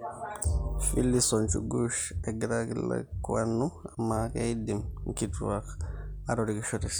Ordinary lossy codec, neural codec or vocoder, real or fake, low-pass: none; none; real; none